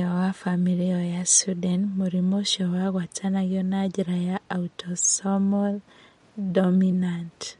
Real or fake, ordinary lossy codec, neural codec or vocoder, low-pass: real; MP3, 48 kbps; none; 19.8 kHz